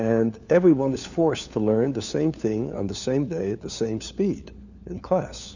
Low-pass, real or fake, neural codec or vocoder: 7.2 kHz; fake; codec, 16 kHz, 4 kbps, FunCodec, trained on LibriTTS, 50 frames a second